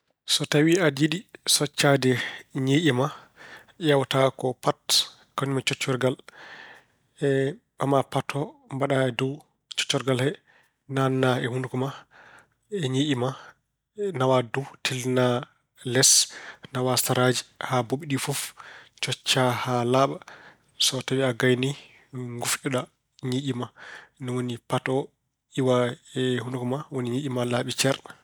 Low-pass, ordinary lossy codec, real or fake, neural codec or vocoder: none; none; real; none